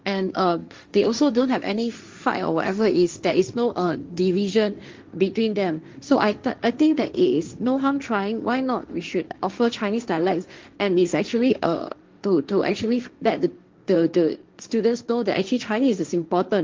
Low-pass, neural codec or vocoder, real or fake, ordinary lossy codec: 7.2 kHz; codec, 16 kHz, 1.1 kbps, Voila-Tokenizer; fake; Opus, 32 kbps